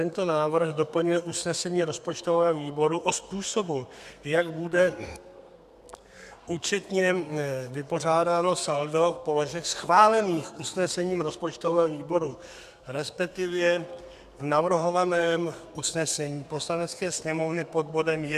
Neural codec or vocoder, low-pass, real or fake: codec, 32 kHz, 1.9 kbps, SNAC; 14.4 kHz; fake